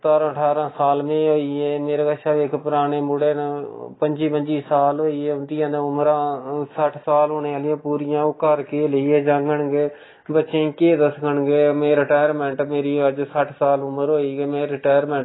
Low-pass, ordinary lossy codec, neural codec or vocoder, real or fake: 7.2 kHz; AAC, 16 kbps; none; real